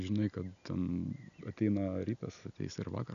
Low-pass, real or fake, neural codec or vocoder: 7.2 kHz; real; none